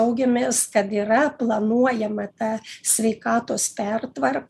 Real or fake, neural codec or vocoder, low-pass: real; none; 14.4 kHz